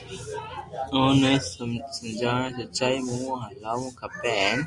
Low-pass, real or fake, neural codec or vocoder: 10.8 kHz; real; none